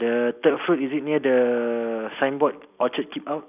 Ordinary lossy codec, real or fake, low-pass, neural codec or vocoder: none; fake; 3.6 kHz; vocoder, 44.1 kHz, 128 mel bands every 256 samples, BigVGAN v2